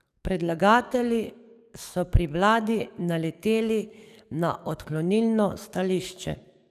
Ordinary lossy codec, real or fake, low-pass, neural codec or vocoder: none; fake; 14.4 kHz; codec, 44.1 kHz, 7.8 kbps, DAC